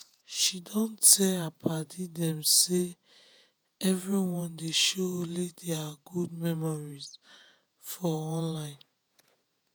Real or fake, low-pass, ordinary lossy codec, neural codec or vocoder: fake; none; none; vocoder, 48 kHz, 128 mel bands, Vocos